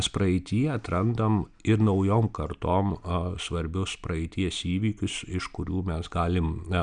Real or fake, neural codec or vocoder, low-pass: real; none; 9.9 kHz